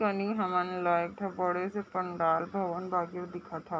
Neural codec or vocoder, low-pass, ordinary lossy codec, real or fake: none; none; none; real